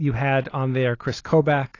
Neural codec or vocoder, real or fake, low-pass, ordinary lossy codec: none; real; 7.2 kHz; AAC, 32 kbps